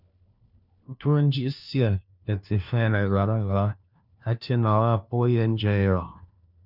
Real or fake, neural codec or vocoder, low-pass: fake; codec, 16 kHz, 1 kbps, FunCodec, trained on LibriTTS, 50 frames a second; 5.4 kHz